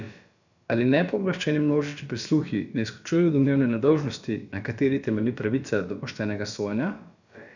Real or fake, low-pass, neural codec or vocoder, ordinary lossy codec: fake; 7.2 kHz; codec, 16 kHz, about 1 kbps, DyCAST, with the encoder's durations; none